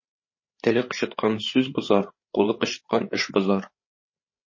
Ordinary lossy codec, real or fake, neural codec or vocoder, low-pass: MP3, 32 kbps; fake; codec, 16 kHz, 8 kbps, FreqCodec, larger model; 7.2 kHz